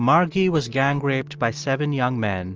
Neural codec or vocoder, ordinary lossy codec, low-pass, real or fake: none; Opus, 24 kbps; 7.2 kHz; real